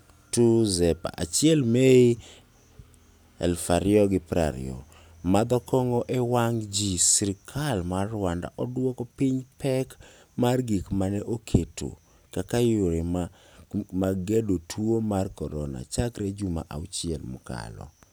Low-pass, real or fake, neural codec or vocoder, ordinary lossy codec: none; real; none; none